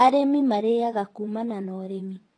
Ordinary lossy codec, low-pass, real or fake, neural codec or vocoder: AAC, 32 kbps; 9.9 kHz; fake; vocoder, 22.05 kHz, 80 mel bands, WaveNeXt